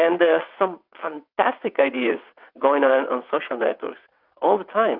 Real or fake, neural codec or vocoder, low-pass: fake; vocoder, 22.05 kHz, 80 mel bands, WaveNeXt; 5.4 kHz